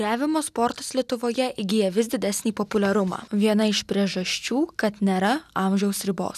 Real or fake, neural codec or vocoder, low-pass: real; none; 14.4 kHz